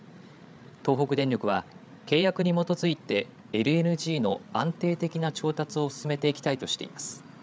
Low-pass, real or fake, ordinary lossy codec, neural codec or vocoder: none; fake; none; codec, 16 kHz, 8 kbps, FreqCodec, larger model